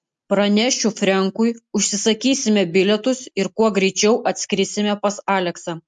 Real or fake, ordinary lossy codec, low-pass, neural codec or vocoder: real; MP3, 64 kbps; 10.8 kHz; none